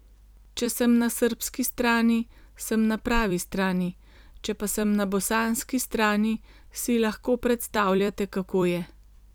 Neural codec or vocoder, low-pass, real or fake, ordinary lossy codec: vocoder, 44.1 kHz, 128 mel bands every 256 samples, BigVGAN v2; none; fake; none